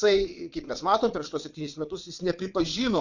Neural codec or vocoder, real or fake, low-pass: vocoder, 22.05 kHz, 80 mel bands, WaveNeXt; fake; 7.2 kHz